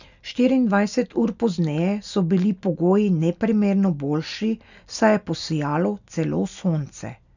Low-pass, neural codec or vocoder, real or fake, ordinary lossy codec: 7.2 kHz; none; real; none